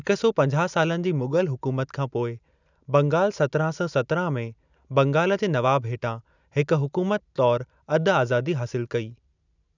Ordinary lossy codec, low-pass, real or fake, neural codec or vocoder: none; 7.2 kHz; real; none